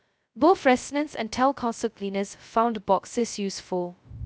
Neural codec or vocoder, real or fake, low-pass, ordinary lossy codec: codec, 16 kHz, 0.2 kbps, FocalCodec; fake; none; none